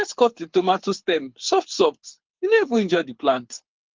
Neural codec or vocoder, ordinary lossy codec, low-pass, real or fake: codec, 24 kHz, 6 kbps, HILCodec; Opus, 32 kbps; 7.2 kHz; fake